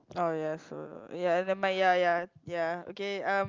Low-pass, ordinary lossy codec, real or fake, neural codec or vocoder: 7.2 kHz; Opus, 24 kbps; real; none